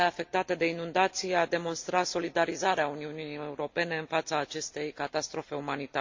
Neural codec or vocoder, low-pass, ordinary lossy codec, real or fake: none; 7.2 kHz; MP3, 48 kbps; real